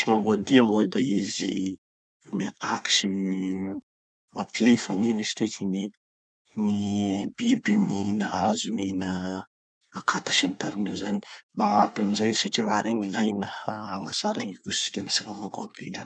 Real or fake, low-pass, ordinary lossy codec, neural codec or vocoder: fake; 9.9 kHz; none; codec, 24 kHz, 1 kbps, SNAC